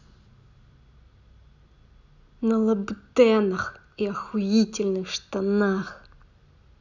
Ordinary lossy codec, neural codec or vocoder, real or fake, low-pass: none; none; real; 7.2 kHz